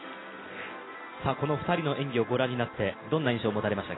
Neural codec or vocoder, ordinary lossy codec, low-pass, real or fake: none; AAC, 16 kbps; 7.2 kHz; real